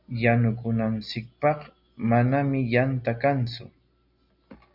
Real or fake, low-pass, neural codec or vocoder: real; 5.4 kHz; none